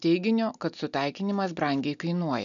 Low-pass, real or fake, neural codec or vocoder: 7.2 kHz; real; none